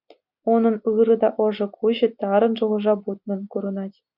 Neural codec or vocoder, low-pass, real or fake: none; 5.4 kHz; real